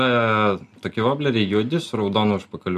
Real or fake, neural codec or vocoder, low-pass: real; none; 14.4 kHz